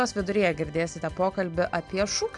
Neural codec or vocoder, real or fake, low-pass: none; real; 10.8 kHz